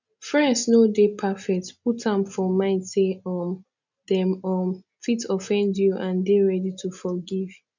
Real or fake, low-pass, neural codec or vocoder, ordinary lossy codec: real; 7.2 kHz; none; none